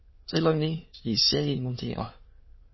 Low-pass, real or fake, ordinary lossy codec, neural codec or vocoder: 7.2 kHz; fake; MP3, 24 kbps; autoencoder, 22.05 kHz, a latent of 192 numbers a frame, VITS, trained on many speakers